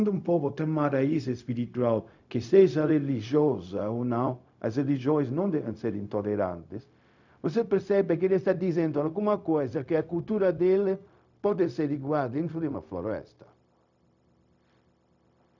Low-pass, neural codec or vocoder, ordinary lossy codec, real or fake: 7.2 kHz; codec, 16 kHz, 0.4 kbps, LongCat-Audio-Codec; none; fake